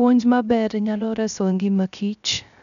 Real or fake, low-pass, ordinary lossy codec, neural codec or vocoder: fake; 7.2 kHz; none; codec, 16 kHz, 0.3 kbps, FocalCodec